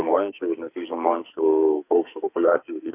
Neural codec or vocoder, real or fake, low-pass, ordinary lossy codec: codec, 44.1 kHz, 2.6 kbps, SNAC; fake; 3.6 kHz; AAC, 24 kbps